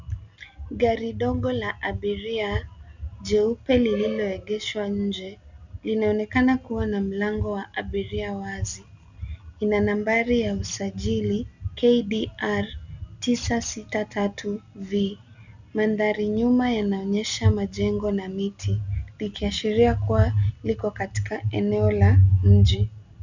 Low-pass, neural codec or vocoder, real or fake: 7.2 kHz; none; real